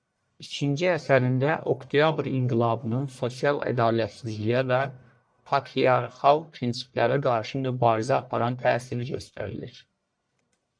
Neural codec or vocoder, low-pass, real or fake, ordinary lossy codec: codec, 44.1 kHz, 1.7 kbps, Pupu-Codec; 9.9 kHz; fake; MP3, 96 kbps